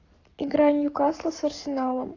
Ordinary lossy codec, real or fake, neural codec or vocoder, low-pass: AAC, 32 kbps; fake; codec, 44.1 kHz, 7.8 kbps, Pupu-Codec; 7.2 kHz